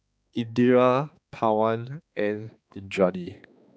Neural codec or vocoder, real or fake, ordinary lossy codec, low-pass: codec, 16 kHz, 2 kbps, X-Codec, HuBERT features, trained on balanced general audio; fake; none; none